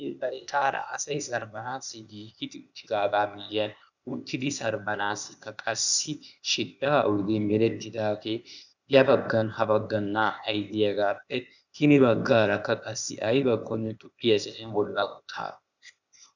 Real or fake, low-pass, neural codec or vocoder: fake; 7.2 kHz; codec, 16 kHz, 0.8 kbps, ZipCodec